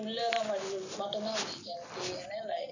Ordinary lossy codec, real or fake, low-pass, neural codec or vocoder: none; real; 7.2 kHz; none